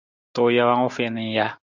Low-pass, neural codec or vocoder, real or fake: 7.2 kHz; none; real